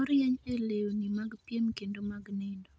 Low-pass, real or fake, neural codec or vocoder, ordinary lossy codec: none; real; none; none